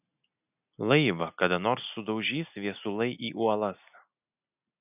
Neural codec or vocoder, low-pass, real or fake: none; 3.6 kHz; real